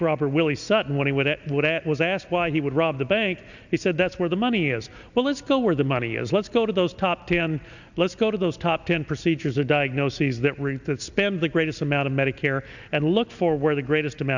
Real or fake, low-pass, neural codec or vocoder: real; 7.2 kHz; none